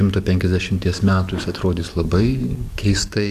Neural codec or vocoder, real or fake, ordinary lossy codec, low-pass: codec, 44.1 kHz, 7.8 kbps, DAC; fake; Opus, 64 kbps; 14.4 kHz